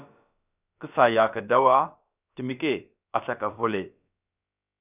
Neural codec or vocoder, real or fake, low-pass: codec, 16 kHz, about 1 kbps, DyCAST, with the encoder's durations; fake; 3.6 kHz